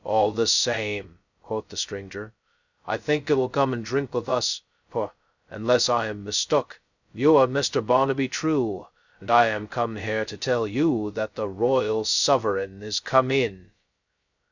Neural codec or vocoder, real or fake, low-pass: codec, 16 kHz, 0.2 kbps, FocalCodec; fake; 7.2 kHz